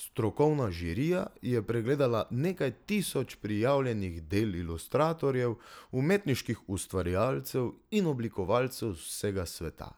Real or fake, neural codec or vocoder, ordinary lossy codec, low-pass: real; none; none; none